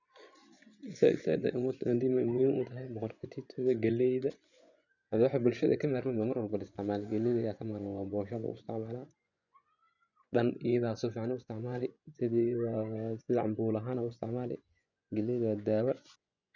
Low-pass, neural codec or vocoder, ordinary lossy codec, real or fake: 7.2 kHz; vocoder, 24 kHz, 100 mel bands, Vocos; none; fake